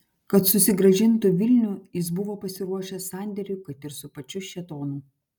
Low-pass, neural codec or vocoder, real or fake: 19.8 kHz; none; real